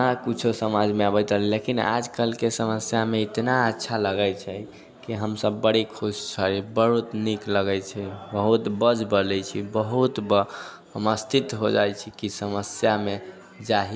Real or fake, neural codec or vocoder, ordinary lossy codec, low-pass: real; none; none; none